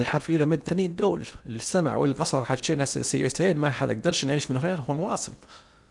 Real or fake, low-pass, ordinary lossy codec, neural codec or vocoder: fake; 10.8 kHz; none; codec, 16 kHz in and 24 kHz out, 0.8 kbps, FocalCodec, streaming, 65536 codes